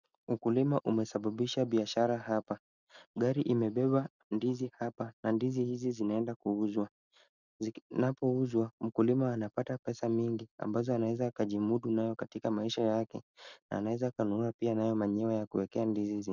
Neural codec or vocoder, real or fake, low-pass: none; real; 7.2 kHz